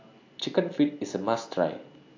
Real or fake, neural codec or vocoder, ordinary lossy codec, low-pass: real; none; AAC, 48 kbps; 7.2 kHz